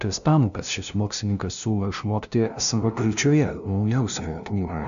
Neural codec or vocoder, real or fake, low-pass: codec, 16 kHz, 0.5 kbps, FunCodec, trained on LibriTTS, 25 frames a second; fake; 7.2 kHz